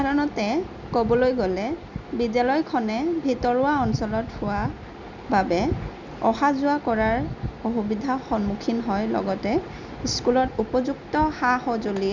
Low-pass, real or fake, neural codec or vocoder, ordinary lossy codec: 7.2 kHz; real; none; none